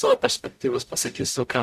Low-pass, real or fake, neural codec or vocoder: 14.4 kHz; fake; codec, 44.1 kHz, 0.9 kbps, DAC